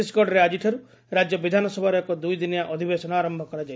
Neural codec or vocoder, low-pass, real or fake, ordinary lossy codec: none; none; real; none